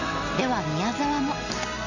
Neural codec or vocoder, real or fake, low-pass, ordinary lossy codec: none; real; 7.2 kHz; none